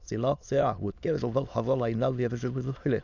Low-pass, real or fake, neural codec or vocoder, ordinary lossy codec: 7.2 kHz; fake; autoencoder, 22.05 kHz, a latent of 192 numbers a frame, VITS, trained on many speakers; none